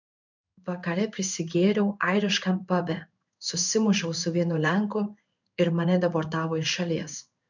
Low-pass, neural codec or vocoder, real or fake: 7.2 kHz; codec, 16 kHz in and 24 kHz out, 1 kbps, XY-Tokenizer; fake